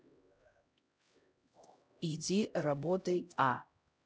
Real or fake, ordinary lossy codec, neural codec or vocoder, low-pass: fake; none; codec, 16 kHz, 0.5 kbps, X-Codec, HuBERT features, trained on LibriSpeech; none